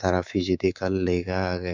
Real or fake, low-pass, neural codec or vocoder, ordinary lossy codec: fake; 7.2 kHz; codec, 24 kHz, 3.1 kbps, DualCodec; MP3, 64 kbps